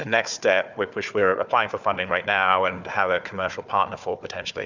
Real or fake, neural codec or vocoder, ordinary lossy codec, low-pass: fake; codec, 16 kHz, 4 kbps, FunCodec, trained on Chinese and English, 50 frames a second; Opus, 64 kbps; 7.2 kHz